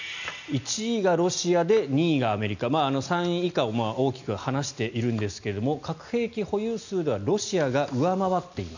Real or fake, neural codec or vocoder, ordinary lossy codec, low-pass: real; none; none; 7.2 kHz